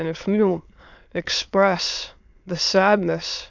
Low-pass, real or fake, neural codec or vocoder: 7.2 kHz; fake; autoencoder, 22.05 kHz, a latent of 192 numbers a frame, VITS, trained on many speakers